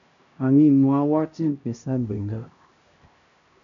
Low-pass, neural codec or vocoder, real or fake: 7.2 kHz; codec, 16 kHz, 1 kbps, X-Codec, HuBERT features, trained on LibriSpeech; fake